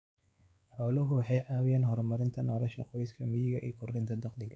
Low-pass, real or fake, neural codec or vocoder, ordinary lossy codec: none; fake; codec, 16 kHz, 4 kbps, X-Codec, WavLM features, trained on Multilingual LibriSpeech; none